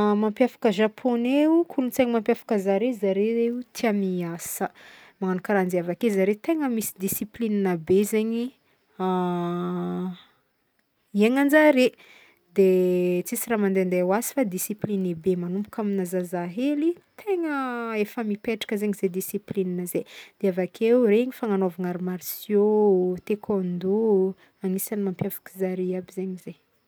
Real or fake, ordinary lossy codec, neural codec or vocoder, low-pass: real; none; none; none